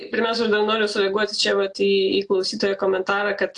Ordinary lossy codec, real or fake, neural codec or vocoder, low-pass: AAC, 64 kbps; real; none; 10.8 kHz